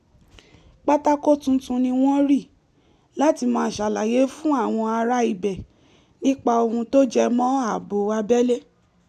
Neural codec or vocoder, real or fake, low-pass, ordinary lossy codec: none; real; 14.4 kHz; none